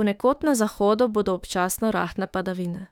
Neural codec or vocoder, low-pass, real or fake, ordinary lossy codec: autoencoder, 48 kHz, 32 numbers a frame, DAC-VAE, trained on Japanese speech; 19.8 kHz; fake; none